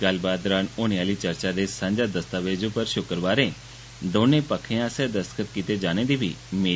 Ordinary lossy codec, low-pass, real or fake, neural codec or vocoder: none; none; real; none